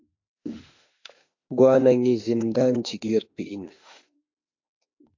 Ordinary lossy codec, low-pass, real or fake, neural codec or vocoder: AAC, 48 kbps; 7.2 kHz; fake; autoencoder, 48 kHz, 32 numbers a frame, DAC-VAE, trained on Japanese speech